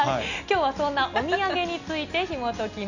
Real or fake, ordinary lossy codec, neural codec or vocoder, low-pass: real; none; none; 7.2 kHz